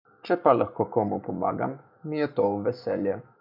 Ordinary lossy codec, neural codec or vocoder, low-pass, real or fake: none; codec, 44.1 kHz, 7.8 kbps, Pupu-Codec; 5.4 kHz; fake